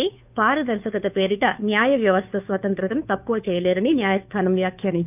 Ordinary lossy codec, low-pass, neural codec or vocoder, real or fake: none; 3.6 kHz; codec, 16 kHz, 2 kbps, FunCodec, trained on Chinese and English, 25 frames a second; fake